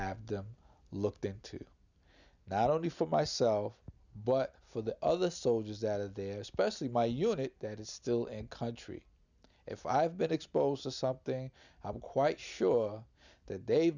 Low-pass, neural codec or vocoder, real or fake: 7.2 kHz; none; real